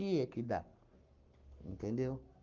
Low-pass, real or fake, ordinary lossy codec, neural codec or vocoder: 7.2 kHz; fake; Opus, 24 kbps; codec, 44.1 kHz, 7.8 kbps, Pupu-Codec